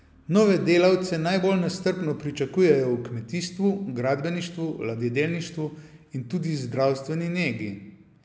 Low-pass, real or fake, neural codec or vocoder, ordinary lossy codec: none; real; none; none